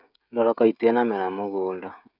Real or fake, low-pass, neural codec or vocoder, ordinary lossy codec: fake; 5.4 kHz; codec, 16 kHz, 16 kbps, FreqCodec, smaller model; none